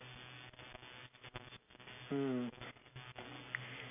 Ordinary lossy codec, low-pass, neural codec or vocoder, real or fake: none; 3.6 kHz; none; real